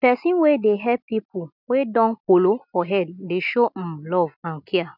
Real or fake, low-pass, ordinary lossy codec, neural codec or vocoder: real; 5.4 kHz; none; none